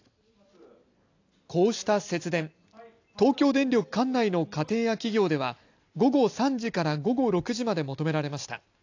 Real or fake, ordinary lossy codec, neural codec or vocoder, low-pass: real; AAC, 48 kbps; none; 7.2 kHz